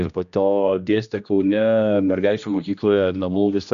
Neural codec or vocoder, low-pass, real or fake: codec, 16 kHz, 1 kbps, X-Codec, HuBERT features, trained on general audio; 7.2 kHz; fake